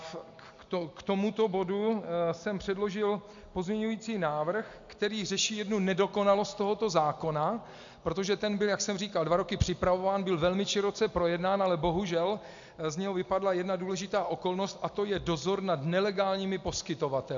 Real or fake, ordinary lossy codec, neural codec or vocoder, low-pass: real; MP3, 64 kbps; none; 7.2 kHz